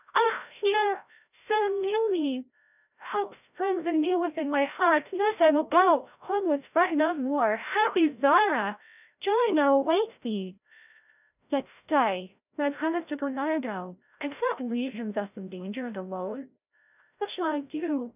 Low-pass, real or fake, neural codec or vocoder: 3.6 kHz; fake; codec, 16 kHz, 0.5 kbps, FreqCodec, larger model